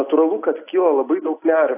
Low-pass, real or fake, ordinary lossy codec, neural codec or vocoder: 3.6 kHz; real; AAC, 24 kbps; none